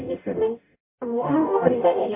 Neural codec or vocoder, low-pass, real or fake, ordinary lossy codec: codec, 44.1 kHz, 0.9 kbps, DAC; 3.6 kHz; fake; MP3, 32 kbps